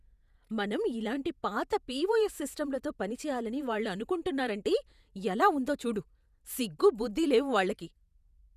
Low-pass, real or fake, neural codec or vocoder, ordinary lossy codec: 14.4 kHz; fake; vocoder, 48 kHz, 128 mel bands, Vocos; none